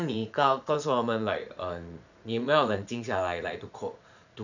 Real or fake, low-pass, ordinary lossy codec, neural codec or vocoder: fake; 7.2 kHz; none; vocoder, 44.1 kHz, 80 mel bands, Vocos